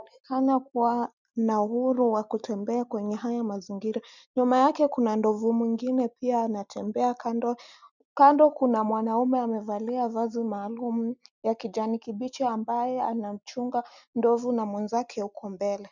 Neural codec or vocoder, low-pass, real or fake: none; 7.2 kHz; real